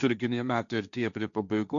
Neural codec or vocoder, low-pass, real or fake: codec, 16 kHz, 1.1 kbps, Voila-Tokenizer; 7.2 kHz; fake